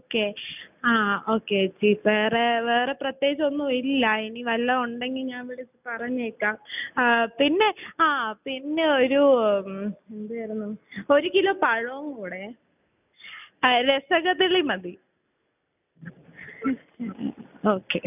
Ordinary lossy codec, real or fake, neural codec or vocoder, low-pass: none; real; none; 3.6 kHz